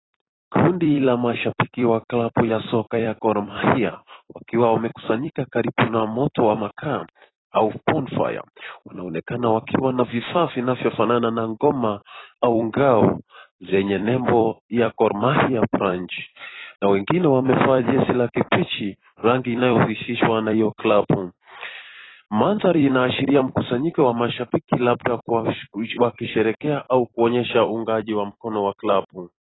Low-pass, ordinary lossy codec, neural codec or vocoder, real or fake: 7.2 kHz; AAC, 16 kbps; vocoder, 44.1 kHz, 128 mel bands every 256 samples, BigVGAN v2; fake